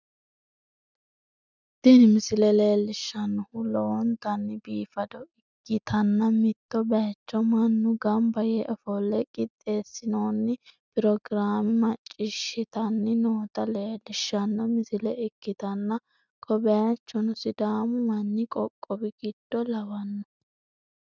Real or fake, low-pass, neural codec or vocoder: real; 7.2 kHz; none